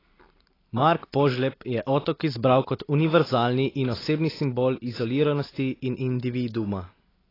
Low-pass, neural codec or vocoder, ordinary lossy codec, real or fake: 5.4 kHz; none; AAC, 24 kbps; real